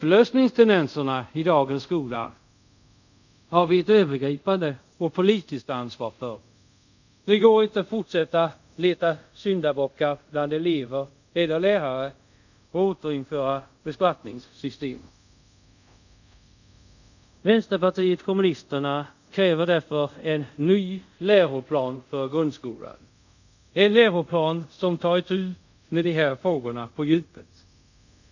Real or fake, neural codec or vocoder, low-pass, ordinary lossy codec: fake; codec, 24 kHz, 0.5 kbps, DualCodec; 7.2 kHz; none